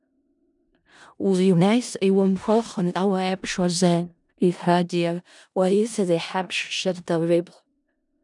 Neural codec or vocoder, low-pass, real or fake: codec, 16 kHz in and 24 kHz out, 0.4 kbps, LongCat-Audio-Codec, four codebook decoder; 10.8 kHz; fake